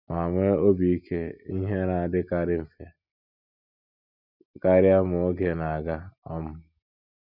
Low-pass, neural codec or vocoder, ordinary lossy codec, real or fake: 5.4 kHz; none; none; real